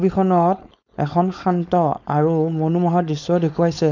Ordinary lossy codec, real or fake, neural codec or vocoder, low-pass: none; fake; codec, 16 kHz, 4.8 kbps, FACodec; 7.2 kHz